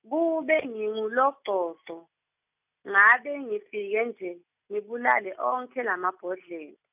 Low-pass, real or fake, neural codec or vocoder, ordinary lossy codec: 3.6 kHz; real; none; none